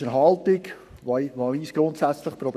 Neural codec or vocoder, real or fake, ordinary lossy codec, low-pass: codec, 44.1 kHz, 7.8 kbps, Pupu-Codec; fake; none; 14.4 kHz